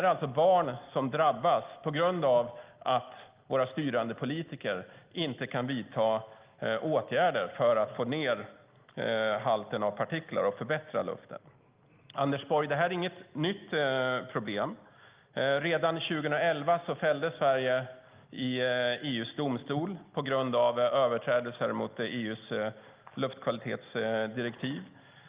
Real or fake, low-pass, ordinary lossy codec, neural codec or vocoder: real; 3.6 kHz; Opus, 32 kbps; none